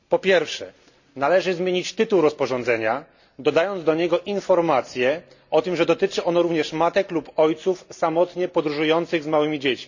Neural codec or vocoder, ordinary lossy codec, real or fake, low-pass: none; none; real; 7.2 kHz